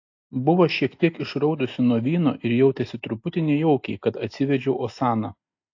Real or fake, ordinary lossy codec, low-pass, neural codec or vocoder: real; AAC, 48 kbps; 7.2 kHz; none